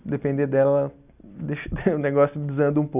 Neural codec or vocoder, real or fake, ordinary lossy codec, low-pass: none; real; none; 3.6 kHz